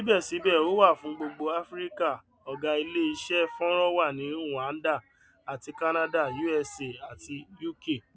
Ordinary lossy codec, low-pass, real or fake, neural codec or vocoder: none; none; real; none